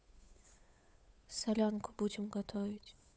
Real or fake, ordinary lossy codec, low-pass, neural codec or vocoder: fake; none; none; codec, 16 kHz, 8 kbps, FunCodec, trained on Chinese and English, 25 frames a second